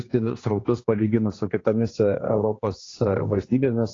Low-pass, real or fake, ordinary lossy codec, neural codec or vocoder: 7.2 kHz; fake; AAC, 32 kbps; codec, 16 kHz, 2 kbps, X-Codec, HuBERT features, trained on general audio